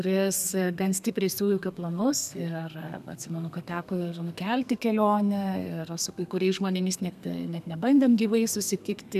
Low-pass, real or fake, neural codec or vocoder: 14.4 kHz; fake; codec, 32 kHz, 1.9 kbps, SNAC